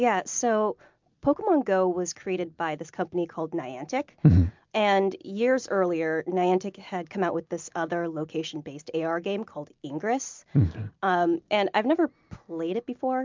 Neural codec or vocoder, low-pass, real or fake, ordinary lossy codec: none; 7.2 kHz; real; MP3, 64 kbps